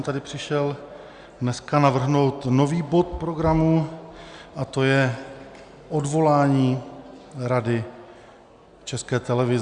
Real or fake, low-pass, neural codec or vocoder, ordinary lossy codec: real; 9.9 kHz; none; Opus, 64 kbps